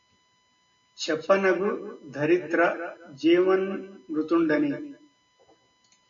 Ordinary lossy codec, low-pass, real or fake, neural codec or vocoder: MP3, 64 kbps; 7.2 kHz; real; none